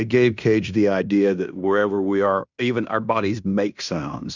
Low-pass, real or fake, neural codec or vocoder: 7.2 kHz; fake; codec, 16 kHz in and 24 kHz out, 0.9 kbps, LongCat-Audio-Codec, fine tuned four codebook decoder